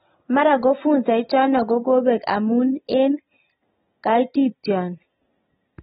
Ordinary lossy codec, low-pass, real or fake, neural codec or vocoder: AAC, 16 kbps; 7.2 kHz; real; none